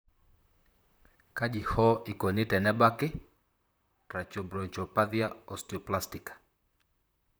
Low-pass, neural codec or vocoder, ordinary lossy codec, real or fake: none; vocoder, 44.1 kHz, 128 mel bands, Pupu-Vocoder; none; fake